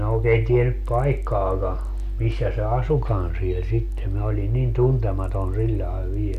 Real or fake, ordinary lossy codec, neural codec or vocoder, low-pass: real; none; none; 14.4 kHz